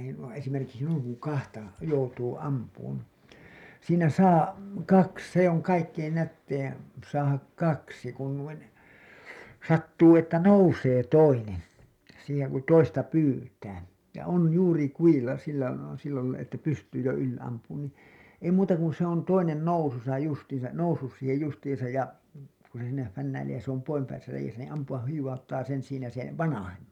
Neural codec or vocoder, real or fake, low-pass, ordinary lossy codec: none; real; 19.8 kHz; none